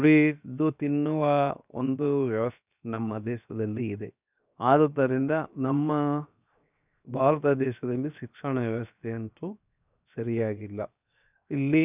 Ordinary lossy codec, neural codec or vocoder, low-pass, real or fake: none; codec, 16 kHz, 0.7 kbps, FocalCodec; 3.6 kHz; fake